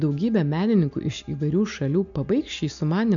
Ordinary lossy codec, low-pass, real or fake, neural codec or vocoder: MP3, 96 kbps; 7.2 kHz; real; none